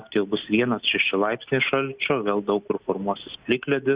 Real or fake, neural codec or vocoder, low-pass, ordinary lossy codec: real; none; 3.6 kHz; Opus, 64 kbps